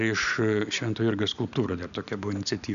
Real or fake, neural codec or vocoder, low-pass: real; none; 7.2 kHz